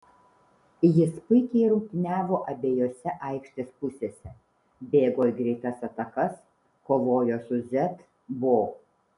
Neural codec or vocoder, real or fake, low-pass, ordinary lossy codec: none; real; 10.8 kHz; MP3, 96 kbps